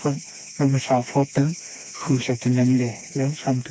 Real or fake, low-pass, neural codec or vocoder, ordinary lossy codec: fake; none; codec, 16 kHz, 2 kbps, FreqCodec, smaller model; none